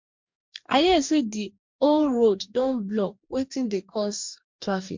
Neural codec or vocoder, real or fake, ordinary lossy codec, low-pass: codec, 44.1 kHz, 2.6 kbps, DAC; fake; MP3, 64 kbps; 7.2 kHz